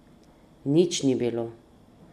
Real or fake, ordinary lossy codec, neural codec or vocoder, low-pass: real; MP3, 64 kbps; none; 14.4 kHz